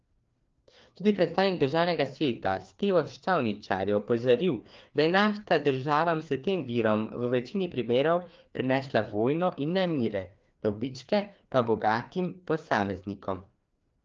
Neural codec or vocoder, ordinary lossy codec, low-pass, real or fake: codec, 16 kHz, 2 kbps, FreqCodec, larger model; Opus, 24 kbps; 7.2 kHz; fake